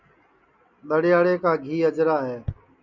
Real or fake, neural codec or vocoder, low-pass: real; none; 7.2 kHz